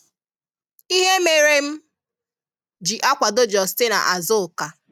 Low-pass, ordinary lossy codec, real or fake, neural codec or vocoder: 19.8 kHz; none; real; none